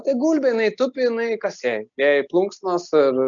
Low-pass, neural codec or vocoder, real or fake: 7.2 kHz; none; real